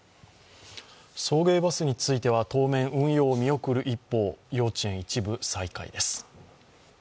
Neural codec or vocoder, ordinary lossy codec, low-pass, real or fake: none; none; none; real